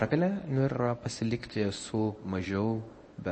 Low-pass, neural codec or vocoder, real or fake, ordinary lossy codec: 10.8 kHz; codec, 24 kHz, 0.9 kbps, WavTokenizer, medium speech release version 1; fake; MP3, 32 kbps